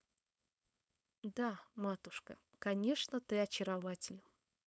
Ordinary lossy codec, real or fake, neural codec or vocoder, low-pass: none; fake; codec, 16 kHz, 4.8 kbps, FACodec; none